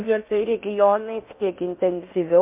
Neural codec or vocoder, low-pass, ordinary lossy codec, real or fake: codec, 16 kHz in and 24 kHz out, 0.8 kbps, FocalCodec, streaming, 65536 codes; 3.6 kHz; MP3, 32 kbps; fake